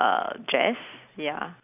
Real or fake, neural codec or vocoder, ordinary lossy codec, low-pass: real; none; none; 3.6 kHz